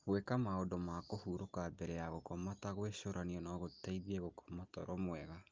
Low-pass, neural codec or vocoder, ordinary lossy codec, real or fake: 7.2 kHz; none; Opus, 32 kbps; real